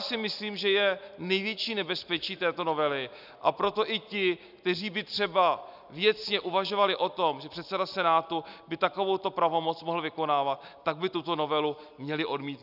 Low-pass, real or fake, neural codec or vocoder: 5.4 kHz; real; none